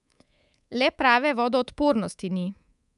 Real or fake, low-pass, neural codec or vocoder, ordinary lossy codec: fake; 10.8 kHz; codec, 24 kHz, 3.1 kbps, DualCodec; none